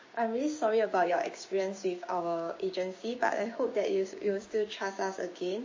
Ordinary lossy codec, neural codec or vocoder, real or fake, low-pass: MP3, 32 kbps; codec, 16 kHz, 6 kbps, DAC; fake; 7.2 kHz